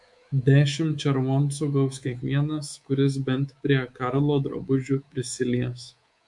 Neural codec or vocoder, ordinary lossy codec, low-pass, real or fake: codec, 24 kHz, 3.1 kbps, DualCodec; MP3, 64 kbps; 10.8 kHz; fake